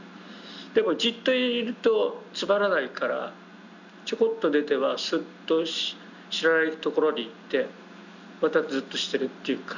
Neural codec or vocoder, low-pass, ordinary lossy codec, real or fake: none; 7.2 kHz; none; real